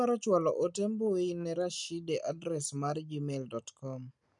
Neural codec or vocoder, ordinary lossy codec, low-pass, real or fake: none; none; 10.8 kHz; real